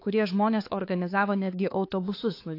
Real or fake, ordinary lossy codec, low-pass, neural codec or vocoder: fake; AAC, 32 kbps; 5.4 kHz; codec, 16 kHz, 4 kbps, X-Codec, HuBERT features, trained on balanced general audio